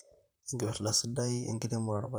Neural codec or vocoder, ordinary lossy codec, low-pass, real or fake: vocoder, 44.1 kHz, 128 mel bands, Pupu-Vocoder; none; none; fake